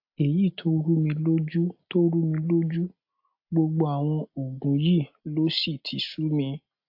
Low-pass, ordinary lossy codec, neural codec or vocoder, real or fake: 5.4 kHz; AAC, 48 kbps; none; real